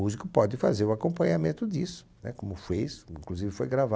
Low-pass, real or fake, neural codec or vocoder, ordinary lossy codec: none; real; none; none